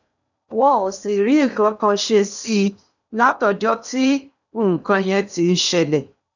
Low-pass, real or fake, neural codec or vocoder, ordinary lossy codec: 7.2 kHz; fake; codec, 16 kHz in and 24 kHz out, 0.8 kbps, FocalCodec, streaming, 65536 codes; none